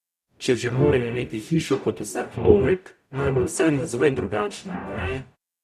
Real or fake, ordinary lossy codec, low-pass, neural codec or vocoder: fake; none; 14.4 kHz; codec, 44.1 kHz, 0.9 kbps, DAC